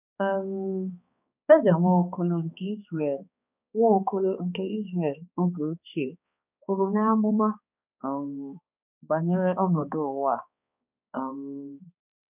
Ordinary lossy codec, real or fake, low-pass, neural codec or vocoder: none; fake; 3.6 kHz; codec, 16 kHz, 2 kbps, X-Codec, HuBERT features, trained on balanced general audio